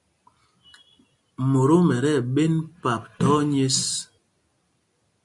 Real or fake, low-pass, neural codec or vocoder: real; 10.8 kHz; none